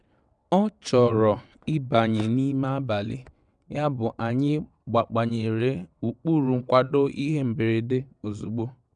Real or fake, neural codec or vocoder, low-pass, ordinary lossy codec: fake; vocoder, 22.05 kHz, 80 mel bands, WaveNeXt; 9.9 kHz; none